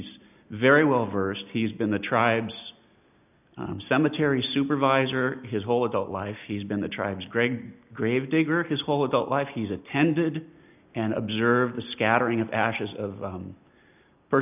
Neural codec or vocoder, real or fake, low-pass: none; real; 3.6 kHz